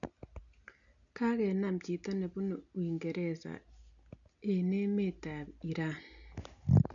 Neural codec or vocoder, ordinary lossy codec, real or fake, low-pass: none; none; real; 7.2 kHz